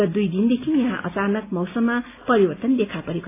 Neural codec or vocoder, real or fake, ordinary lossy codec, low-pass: none; real; AAC, 24 kbps; 3.6 kHz